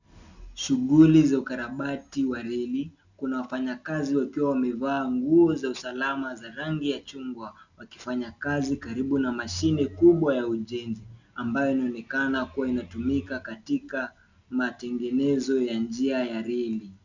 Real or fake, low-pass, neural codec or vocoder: real; 7.2 kHz; none